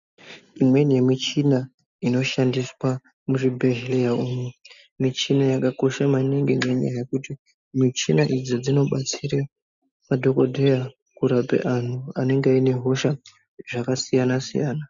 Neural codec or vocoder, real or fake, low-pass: none; real; 7.2 kHz